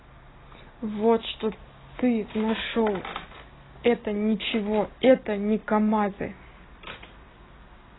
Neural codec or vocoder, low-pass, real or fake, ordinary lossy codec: none; 7.2 kHz; real; AAC, 16 kbps